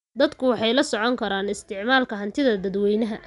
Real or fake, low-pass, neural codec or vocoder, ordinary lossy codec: real; 10.8 kHz; none; none